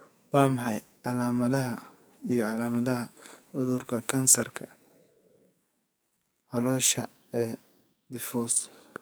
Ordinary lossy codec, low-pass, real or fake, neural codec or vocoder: none; none; fake; codec, 44.1 kHz, 2.6 kbps, SNAC